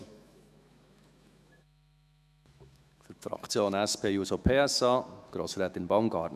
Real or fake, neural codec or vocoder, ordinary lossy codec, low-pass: fake; autoencoder, 48 kHz, 128 numbers a frame, DAC-VAE, trained on Japanese speech; none; 14.4 kHz